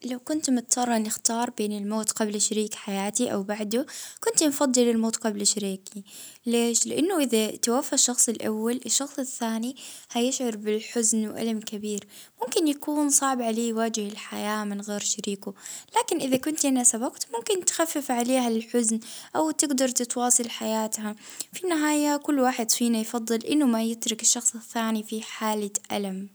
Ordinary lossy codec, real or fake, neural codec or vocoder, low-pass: none; real; none; none